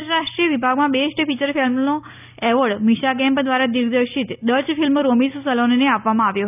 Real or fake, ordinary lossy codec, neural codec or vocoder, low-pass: real; none; none; 3.6 kHz